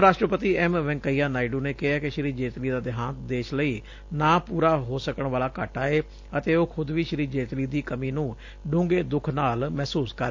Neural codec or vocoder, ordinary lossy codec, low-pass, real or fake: none; AAC, 48 kbps; 7.2 kHz; real